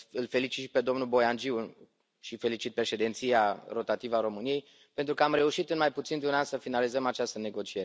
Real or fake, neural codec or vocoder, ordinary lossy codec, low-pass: real; none; none; none